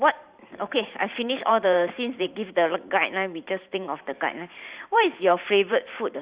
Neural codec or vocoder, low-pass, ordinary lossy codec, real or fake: vocoder, 44.1 kHz, 128 mel bands every 512 samples, BigVGAN v2; 3.6 kHz; Opus, 64 kbps; fake